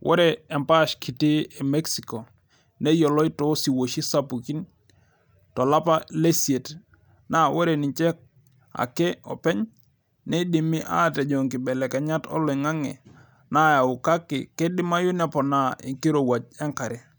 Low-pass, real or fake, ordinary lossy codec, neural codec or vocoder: none; real; none; none